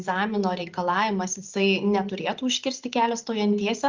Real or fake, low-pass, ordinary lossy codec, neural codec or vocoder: real; 7.2 kHz; Opus, 32 kbps; none